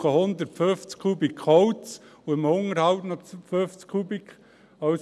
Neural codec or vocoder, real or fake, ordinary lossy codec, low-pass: none; real; none; none